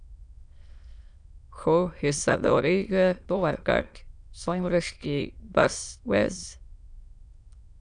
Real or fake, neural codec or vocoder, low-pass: fake; autoencoder, 22.05 kHz, a latent of 192 numbers a frame, VITS, trained on many speakers; 9.9 kHz